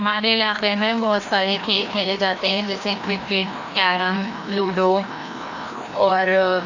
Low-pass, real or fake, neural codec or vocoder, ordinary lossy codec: 7.2 kHz; fake; codec, 16 kHz, 1 kbps, FreqCodec, larger model; AAC, 48 kbps